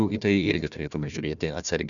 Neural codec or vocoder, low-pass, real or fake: codec, 16 kHz, 1 kbps, FunCodec, trained on Chinese and English, 50 frames a second; 7.2 kHz; fake